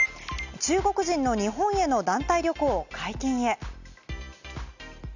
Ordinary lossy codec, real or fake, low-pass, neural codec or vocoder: none; real; 7.2 kHz; none